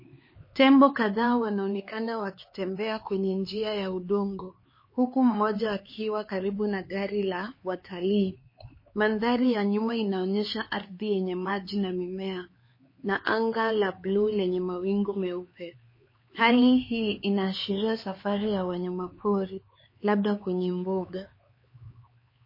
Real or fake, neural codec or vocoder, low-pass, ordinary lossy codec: fake; codec, 16 kHz, 4 kbps, X-Codec, HuBERT features, trained on LibriSpeech; 5.4 kHz; MP3, 24 kbps